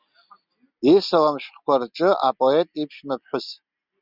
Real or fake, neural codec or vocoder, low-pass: real; none; 5.4 kHz